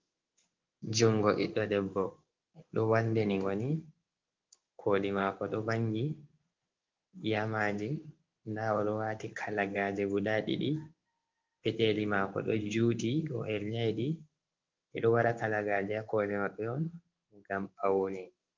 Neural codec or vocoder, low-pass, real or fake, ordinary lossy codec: codec, 16 kHz in and 24 kHz out, 1 kbps, XY-Tokenizer; 7.2 kHz; fake; Opus, 32 kbps